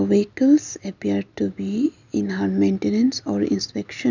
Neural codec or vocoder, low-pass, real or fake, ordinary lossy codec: none; 7.2 kHz; real; none